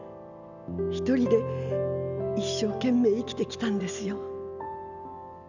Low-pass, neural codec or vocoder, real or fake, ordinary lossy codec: 7.2 kHz; none; real; none